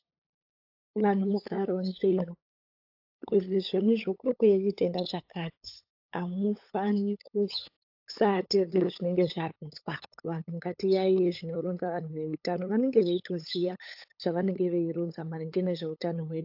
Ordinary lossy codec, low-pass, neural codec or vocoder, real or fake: AAC, 48 kbps; 5.4 kHz; codec, 16 kHz, 8 kbps, FunCodec, trained on LibriTTS, 25 frames a second; fake